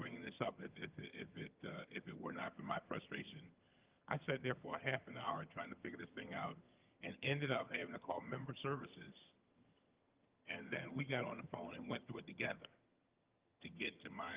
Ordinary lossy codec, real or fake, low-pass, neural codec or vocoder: Opus, 24 kbps; fake; 3.6 kHz; vocoder, 22.05 kHz, 80 mel bands, HiFi-GAN